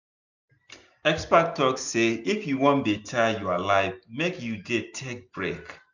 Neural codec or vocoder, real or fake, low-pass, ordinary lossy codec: none; real; 7.2 kHz; none